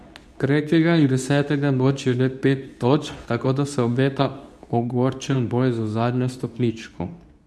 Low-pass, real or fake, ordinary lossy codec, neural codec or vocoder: none; fake; none; codec, 24 kHz, 0.9 kbps, WavTokenizer, medium speech release version 2